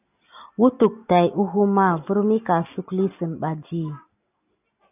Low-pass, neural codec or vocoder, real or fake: 3.6 kHz; none; real